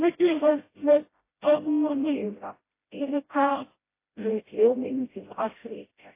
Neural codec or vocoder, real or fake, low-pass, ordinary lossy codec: codec, 16 kHz, 0.5 kbps, FreqCodec, smaller model; fake; 3.6 kHz; AAC, 24 kbps